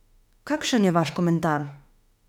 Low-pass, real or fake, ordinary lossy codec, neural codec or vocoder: 19.8 kHz; fake; none; autoencoder, 48 kHz, 32 numbers a frame, DAC-VAE, trained on Japanese speech